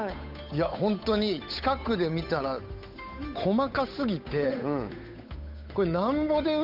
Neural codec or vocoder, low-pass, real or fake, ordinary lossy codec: codec, 16 kHz, 8 kbps, FunCodec, trained on Chinese and English, 25 frames a second; 5.4 kHz; fake; none